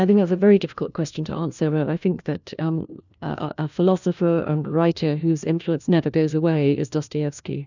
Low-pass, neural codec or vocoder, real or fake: 7.2 kHz; codec, 16 kHz, 1 kbps, FunCodec, trained on LibriTTS, 50 frames a second; fake